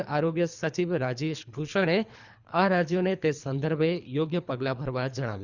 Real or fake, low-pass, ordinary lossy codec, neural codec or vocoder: fake; 7.2 kHz; Opus, 64 kbps; codec, 24 kHz, 3 kbps, HILCodec